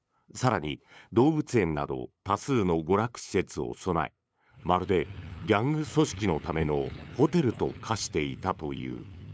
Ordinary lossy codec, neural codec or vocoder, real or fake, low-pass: none; codec, 16 kHz, 8 kbps, FunCodec, trained on LibriTTS, 25 frames a second; fake; none